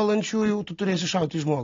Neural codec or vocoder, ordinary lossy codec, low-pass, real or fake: none; AAC, 32 kbps; 7.2 kHz; real